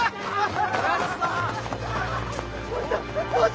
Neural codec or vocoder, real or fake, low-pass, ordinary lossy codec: none; real; none; none